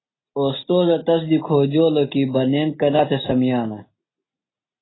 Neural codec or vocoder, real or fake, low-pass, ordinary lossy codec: none; real; 7.2 kHz; AAC, 16 kbps